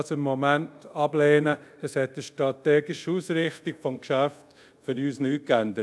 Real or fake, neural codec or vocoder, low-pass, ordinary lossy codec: fake; codec, 24 kHz, 0.5 kbps, DualCodec; none; none